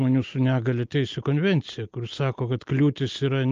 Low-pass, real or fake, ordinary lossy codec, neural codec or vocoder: 7.2 kHz; real; Opus, 24 kbps; none